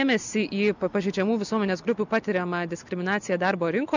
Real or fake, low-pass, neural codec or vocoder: real; 7.2 kHz; none